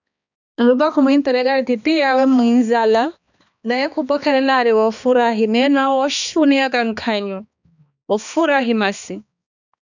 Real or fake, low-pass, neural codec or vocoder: fake; 7.2 kHz; codec, 16 kHz, 2 kbps, X-Codec, HuBERT features, trained on balanced general audio